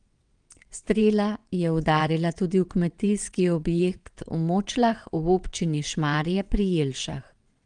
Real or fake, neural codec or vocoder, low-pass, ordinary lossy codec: fake; vocoder, 22.05 kHz, 80 mel bands, WaveNeXt; 9.9 kHz; Opus, 24 kbps